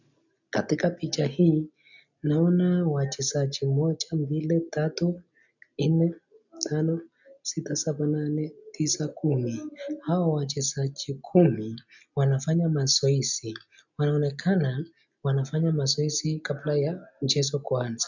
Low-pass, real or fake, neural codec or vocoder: 7.2 kHz; real; none